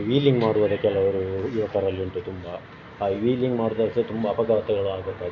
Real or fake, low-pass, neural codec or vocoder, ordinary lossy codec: real; 7.2 kHz; none; none